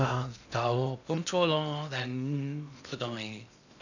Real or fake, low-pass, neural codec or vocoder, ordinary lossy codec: fake; 7.2 kHz; codec, 16 kHz in and 24 kHz out, 0.6 kbps, FocalCodec, streaming, 2048 codes; none